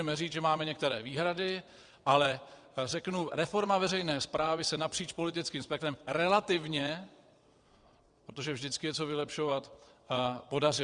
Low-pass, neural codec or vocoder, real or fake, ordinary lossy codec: 9.9 kHz; vocoder, 22.05 kHz, 80 mel bands, WaveNeXt; fake; Opus, 64 kbps